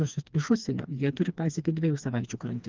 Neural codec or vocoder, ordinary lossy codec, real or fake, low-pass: codec, 16 kHz, 2 kbps, FreqCodec, smaller model; Opus, 32 kbps; fake; 7.2 kHz